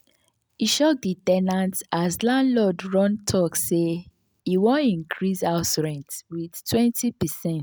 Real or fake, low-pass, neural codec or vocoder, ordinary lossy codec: real; none; none; none